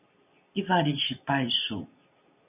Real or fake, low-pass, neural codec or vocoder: real; 3.6 kHz; none